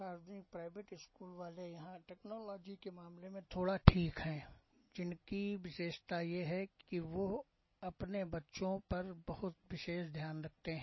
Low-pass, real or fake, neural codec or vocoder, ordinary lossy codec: 7.2 kHz; real; none; MP3, 24 kbps